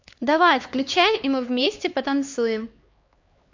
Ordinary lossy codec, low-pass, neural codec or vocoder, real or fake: MP3, 48 kbps; 7.2 kHz; codec, 16 kHz, 2 kbps, X-Codec, HuBERT features, trained on LibriSpeech; fake